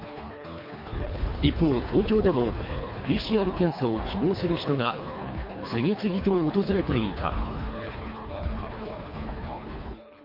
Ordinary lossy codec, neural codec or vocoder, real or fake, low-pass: MP3, 32 kbps; codec, 24 kHz, 3 kbps, HILCodec; fake; 5.4 kHz